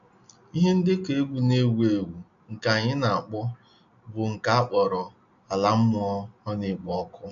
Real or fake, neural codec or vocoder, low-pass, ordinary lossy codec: real; none; 7.2 kHz; none